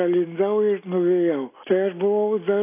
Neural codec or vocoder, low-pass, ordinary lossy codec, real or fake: none; 3.6 kHz; AAC, 24 kbps; real